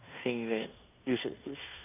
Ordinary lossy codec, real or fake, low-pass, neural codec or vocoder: none; fake; 3.6 kHz; codec, 16 kHz in and 24 kHz out, 0.9 kbps, LongCat-Audio-Codec, fine tuned four codebook decoder